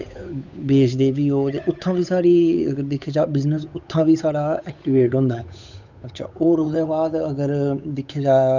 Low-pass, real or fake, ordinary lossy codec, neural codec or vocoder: 7.2 kHz; fake; none; codec, 16 kHz, 8 kbps, FunCodec, trained on Chinese and English, 25 frames a second